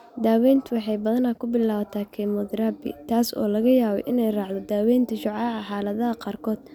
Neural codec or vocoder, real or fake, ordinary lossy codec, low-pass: none; real; none; 19.8 kHz